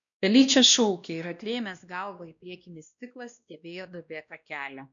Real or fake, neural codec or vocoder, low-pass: fake; codec, 16 kHz, 1 kbps, X-Codec, WavLM features, trained on Multilingual LibriSpeech; 7.2 kHz